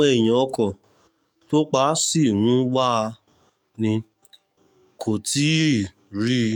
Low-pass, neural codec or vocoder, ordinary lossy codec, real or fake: 19.8 kHz; codec, 44.1 kHz, 7.8 kbps, DAC; none; fake